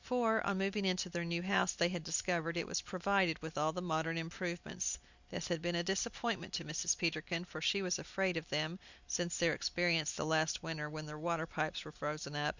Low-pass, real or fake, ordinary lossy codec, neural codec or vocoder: 7.2 kHz; real; Opus, 64 kbps; none